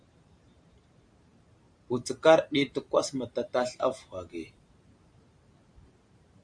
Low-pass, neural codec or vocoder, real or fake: 9.9 kHz; vocoder, 44.1 kHz, 128 mel bands every 512 samples, BigVGAN v2; fake